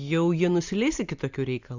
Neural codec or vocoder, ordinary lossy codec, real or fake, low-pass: none; Opus, 64 kbps; real; 7.2 kHz